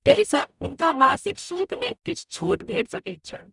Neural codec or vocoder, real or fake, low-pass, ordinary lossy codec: codec, 44.1 kHz, 0.9 kbps, DAC; fake; 10.8 kHz; none